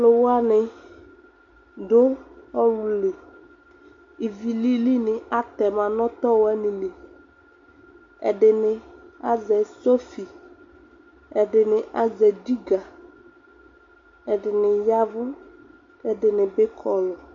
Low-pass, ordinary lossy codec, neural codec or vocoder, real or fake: 7.2 kHz; MP3, 48 kbps; none; real